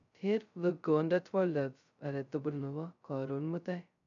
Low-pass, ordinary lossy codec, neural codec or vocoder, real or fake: 7.2 kHz; none; codec, 16 kHz, 0.2 kbps, FocalCodec; fake